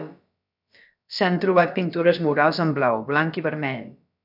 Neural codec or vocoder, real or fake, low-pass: codec, 16 kHz, about 1 kbps, DyCAST, with the encoder's durations; fake; 5.4 kHz